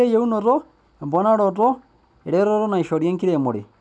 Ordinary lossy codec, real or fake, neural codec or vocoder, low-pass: none; real; none; none